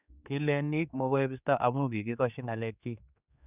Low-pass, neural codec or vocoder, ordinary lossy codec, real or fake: 3.6 kHz; codec, 16 kHz, 4 kbps, X-Codec, HuBERT features, trained on general audio; none; fake